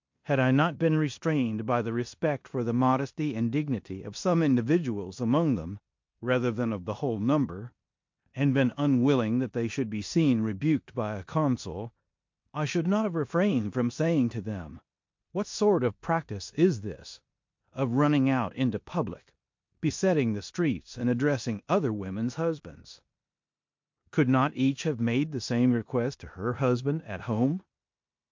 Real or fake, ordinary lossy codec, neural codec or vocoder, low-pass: fake; MP3, 48 kbps; codec, 16 kHz in and 24 kHz out, 0.9 kbps, LongCat-Audio-Codec, four codebook decoder; 7.2 kHz